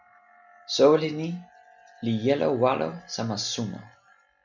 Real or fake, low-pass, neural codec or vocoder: real; 7.2 kHz; none